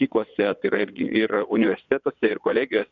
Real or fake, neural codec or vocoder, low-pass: fake; vocoder, 22.05 kHz, 80 mel bands, WaveNeXt; 7.2 kHz